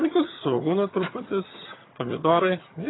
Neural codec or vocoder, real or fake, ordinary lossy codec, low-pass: vocoder, 22.05 kHz, 80 mel bands, HiFi-GAN; fake; AAC, 16 kbps; 7.2 kHz